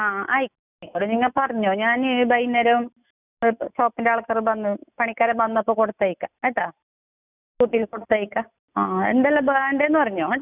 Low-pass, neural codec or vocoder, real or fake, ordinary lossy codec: 3.6 kHz; none; real; none